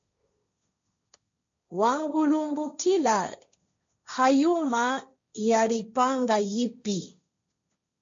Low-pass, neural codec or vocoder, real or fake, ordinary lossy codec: 7.2 kHz; codec, 16 kHz, 1.1 kbps, Voila-Tokenizer; fake; MP3, 48 kbps